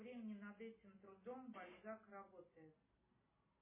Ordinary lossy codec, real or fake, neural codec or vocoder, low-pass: MP3, 16 kbps; real; none; 3.6 kHz